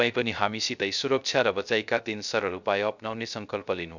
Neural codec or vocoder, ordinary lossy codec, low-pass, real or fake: codec, 16 kHz, 0.3 kbps, FocalCodec; none; 7.2 kHz; fake